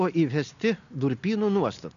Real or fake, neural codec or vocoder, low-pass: real; none; 7.2 kHz